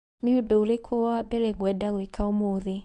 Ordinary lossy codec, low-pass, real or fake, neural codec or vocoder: none; 10.8 kHz; fake; codec, 24 kHz, 0.9 kbps, WavTokenizer, medium speech release version 1